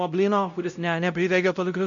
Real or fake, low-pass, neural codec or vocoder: fake; 7.2 kHz; codec, 16 kHz, 0.5 kbps, X-Codec, WavLM features, trained on Multilingual LibriSpeech